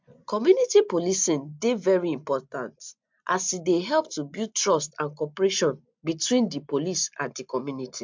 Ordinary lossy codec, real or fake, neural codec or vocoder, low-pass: MP3, 64 kbps; real; none; 7.2 kHz